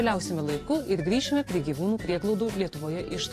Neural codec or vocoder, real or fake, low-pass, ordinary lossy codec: vocoder, 44.1 kHz, 128 mel bands every 512 samples, BigVGAN v2; fake; 14.4 kHz; AAC, 48 kbps